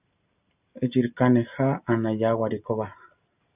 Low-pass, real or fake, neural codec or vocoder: 3.6 kHz; real; none